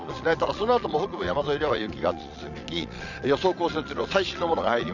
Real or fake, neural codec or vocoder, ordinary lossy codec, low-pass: fake; vocoder, 22.05 kHz, 80 mel bands, Vocos; none; 7.2 kHz